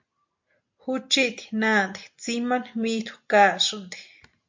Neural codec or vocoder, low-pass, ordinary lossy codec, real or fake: none; 7.2 kHz; MP3, 48 kbps; real